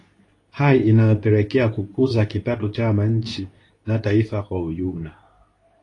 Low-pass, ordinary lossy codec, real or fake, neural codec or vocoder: 10.8 kHz; AAC, 32 kbps; fake; codec, 24 kHz, 0.9 kbps, WavTokenizer, medium speech release version 2